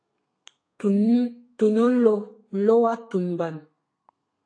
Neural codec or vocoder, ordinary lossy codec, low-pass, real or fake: codec, 32 kHz, 1.9 kbps, SNAC; AAC, 48 kbps; 9.9 kHz; fake